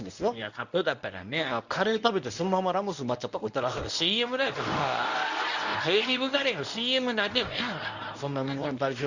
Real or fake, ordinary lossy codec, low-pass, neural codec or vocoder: fake; none; 7.2 kHz; codec, 24 kHz, 0.9 kbps, WavTokenizer, medium speech release version 1